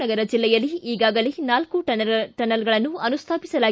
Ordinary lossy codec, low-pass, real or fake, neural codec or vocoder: none; none; real; none